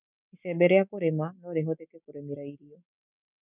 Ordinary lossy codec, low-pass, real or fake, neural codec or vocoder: none; 3.6 kHz; real; none